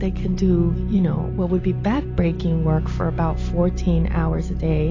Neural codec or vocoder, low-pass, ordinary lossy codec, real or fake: none; 7.2 kHz; AAC, 32 kbps; real